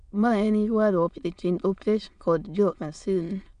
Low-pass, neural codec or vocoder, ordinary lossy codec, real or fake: 9.9 kHz; autoencoder, 22.05 kHz, a latent of 192 numbers a frame, VITS, trained on many speakers; MP3, 64 kbps; fake